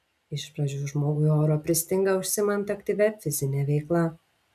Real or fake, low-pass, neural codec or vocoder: real; 14.4 kHz; none